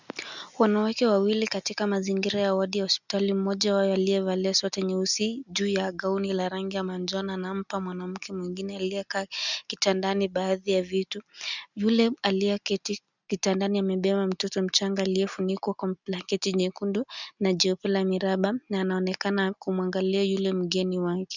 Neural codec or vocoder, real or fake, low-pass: none; real; 7.2 kHz